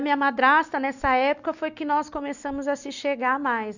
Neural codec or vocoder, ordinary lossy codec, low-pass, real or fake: none; none; 7.2 kHz; real